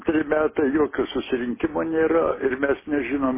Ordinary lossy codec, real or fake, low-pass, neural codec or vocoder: MP3, 16 kbps; real; 3.6 kHz; none